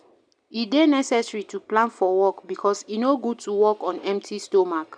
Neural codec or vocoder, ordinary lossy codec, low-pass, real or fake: none; none; 9.9 kHz; real